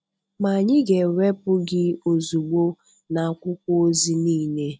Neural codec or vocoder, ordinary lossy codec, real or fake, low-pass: none; none; real; none